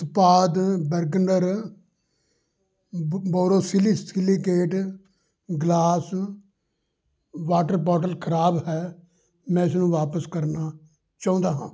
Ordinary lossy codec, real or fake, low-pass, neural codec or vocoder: none; real; none; none